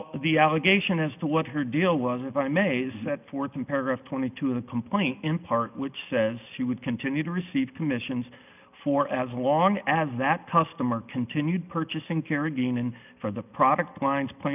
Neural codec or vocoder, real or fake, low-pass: none; real; 3.6 kHz